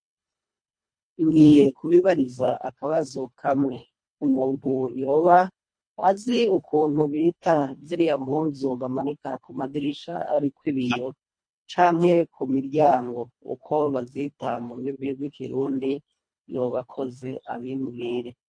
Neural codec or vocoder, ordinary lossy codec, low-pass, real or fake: codec, 24 kHz, 1.5 kbps, HILCodec; MP3, 48 kbps; 9.9 kHz; fake